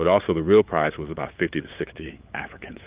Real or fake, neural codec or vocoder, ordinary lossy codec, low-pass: fake; codec, 16 kHz, 8 kbps, FunCodec, trained on LibriTTS, 25 frames a second; Opus, 32 kbps; 3.6 kHz